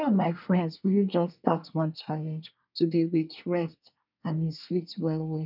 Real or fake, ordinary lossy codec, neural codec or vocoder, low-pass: fake; none; codec, 24 kHz, 1 kbps, SNAC; 5.4 kHz